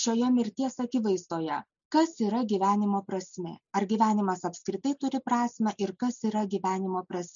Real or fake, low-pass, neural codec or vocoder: real; 7.2 kHz; none